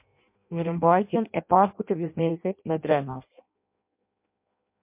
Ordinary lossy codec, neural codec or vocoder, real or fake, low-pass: MP3, 32 kbps; codec, 16 kHz in and 24 kHz out, 0.6 kbps, FireRedTTS-2 codec; fake; 3.6 kHz